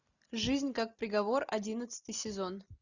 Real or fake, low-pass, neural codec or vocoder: real; 7.2 kHz; none